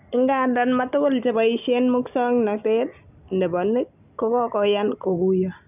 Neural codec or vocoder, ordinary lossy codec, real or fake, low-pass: none; none; real; 3.6 kHz